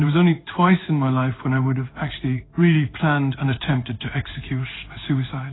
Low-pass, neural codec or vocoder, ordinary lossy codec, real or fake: 7.2 kHz; codec, 16 kHz in and 24 kHz out, 1 kbps, XY-Tokenizer; AAC, 16 kbps; fake